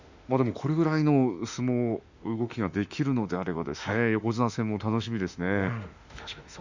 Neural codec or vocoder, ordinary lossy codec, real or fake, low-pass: autoencoder, 48 kHz, 32 numbers a frame, DAC-VAE, trained on Japanese speech; Opus, 64 kbps; fake; 7.2 kHz